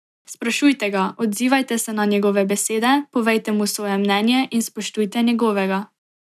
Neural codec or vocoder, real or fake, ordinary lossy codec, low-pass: none; real; none; 14.4 kHz